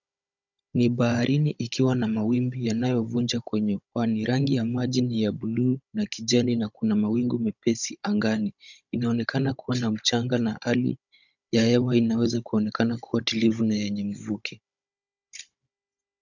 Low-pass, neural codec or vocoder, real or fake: 7.2 kHz; codec, 16 kHz, 16 kbps, FunCodec, trained on Chinese and English, 50 frames a second; fake